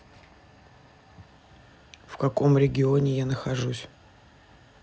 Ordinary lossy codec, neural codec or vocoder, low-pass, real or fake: none; none; none; real